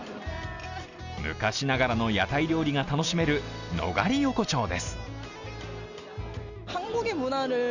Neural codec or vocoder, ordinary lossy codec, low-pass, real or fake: none; none; 7.2 kHz; real